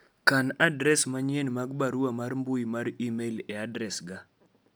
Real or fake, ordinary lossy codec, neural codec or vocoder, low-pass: real; none; none; none